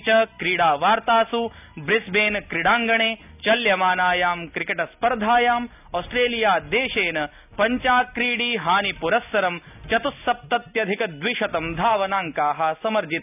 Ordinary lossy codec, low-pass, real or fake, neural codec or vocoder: none; 3.6 kHz; real; none